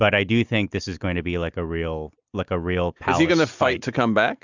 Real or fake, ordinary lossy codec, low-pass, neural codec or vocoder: real; Opus, 64 kbps; 7.2 kHz; none